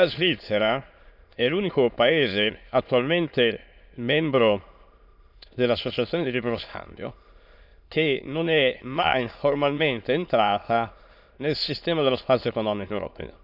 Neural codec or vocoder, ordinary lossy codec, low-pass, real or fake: autoencoder, 22.05 kHz, a latent of 192 numbers a frame, VITS, trained on many speakers; none; 5.4 kHz; fake